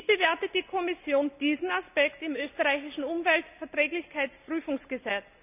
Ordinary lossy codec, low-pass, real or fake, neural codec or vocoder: none; 3.6 kHz; real; none